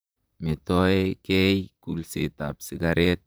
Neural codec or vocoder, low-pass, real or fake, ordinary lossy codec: vocoder, 44.1 kHz, 128 mel bands, Pupu-Vocoder; none; fake; none